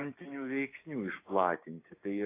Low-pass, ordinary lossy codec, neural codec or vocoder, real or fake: 3.6 kHz; AAC, 24 kbps; codec, 16 kHz, 6 kbps, DAC; fake